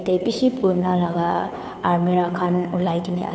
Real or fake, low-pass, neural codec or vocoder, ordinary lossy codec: fake; none; codec, 16 kHz, 2 kbps, FunCodec, trained on Chinese and English, 25 frames a second; none